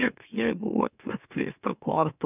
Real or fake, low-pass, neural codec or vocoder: fake; 3.6 kHz; autoencoder, 44.1 kHz, a latent of 192 numbers a frame, MeloTTS